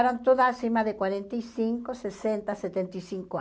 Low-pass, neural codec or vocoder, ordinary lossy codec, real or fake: none; none; none; real